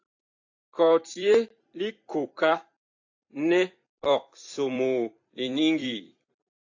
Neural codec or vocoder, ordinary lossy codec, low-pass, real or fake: vocoder, 44.1 kHz, 128 mel bands every 256 samples, BigVGAN v2; AAC, 48 kbps; 7.2 kHz; fake